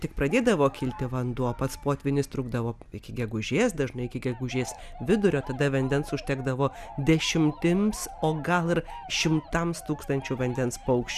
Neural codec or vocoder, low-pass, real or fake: none; 14.4 kHz; real